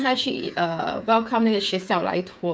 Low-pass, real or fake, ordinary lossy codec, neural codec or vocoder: none; fake; none; codec, 16 kHz, 8 kbps, FreqCodec, smaller model